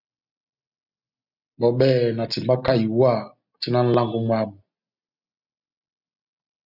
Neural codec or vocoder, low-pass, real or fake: none; 5.4 kHz; real